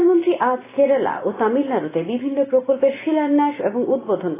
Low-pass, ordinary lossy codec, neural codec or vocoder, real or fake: 3.6 kHz; AAC, 16 kbps; none; real